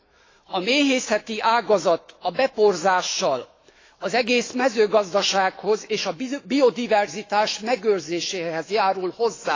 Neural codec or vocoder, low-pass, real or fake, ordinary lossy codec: autoencoder, 48 kHz, 128 numbers a frame, DAC-VAE, trained on Japanese speech; 7.2 kHz; fake; AAC, 32 kbps